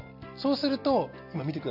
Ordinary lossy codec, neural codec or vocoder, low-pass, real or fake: none; none; 5.4 kHz; real